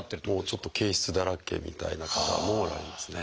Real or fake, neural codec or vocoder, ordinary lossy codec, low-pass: real; none; none; none